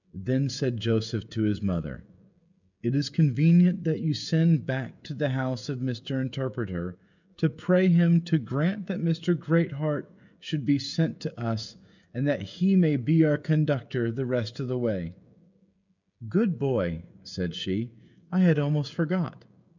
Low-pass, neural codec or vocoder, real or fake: 7.2 kHz; codec, 16 kHz, 16 kbps, FreqCodec, smaller model; fake